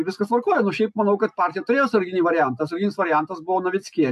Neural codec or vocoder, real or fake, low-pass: none; real; 14.4 kHz